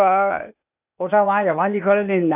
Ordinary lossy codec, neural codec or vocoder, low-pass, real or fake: none; codec, 16 kHz, 0.8 kbps, ZipCodec; 3.6 kHz; fake